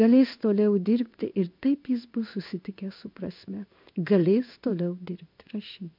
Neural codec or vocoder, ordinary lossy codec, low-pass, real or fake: codec, 16 kHz in and 24 kHz out, 1 kbps, XY-Tokenizer; MP3, 48 kbps; 5.4 kHz; fake